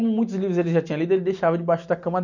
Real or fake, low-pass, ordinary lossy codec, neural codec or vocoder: real; 7.2 kHz; none; none